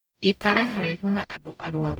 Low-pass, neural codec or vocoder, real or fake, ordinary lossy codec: none; codec, 44.1 kHz, 0.9 kbps, DAC; fake; none